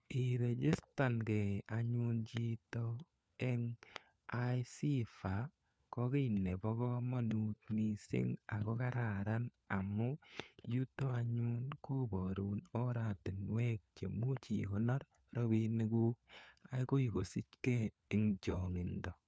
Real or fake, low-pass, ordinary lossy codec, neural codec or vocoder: fake; none; none; codec, 16 kHz, 4 kbps, FunCodec, trained on LibriTTS, 50 frames a second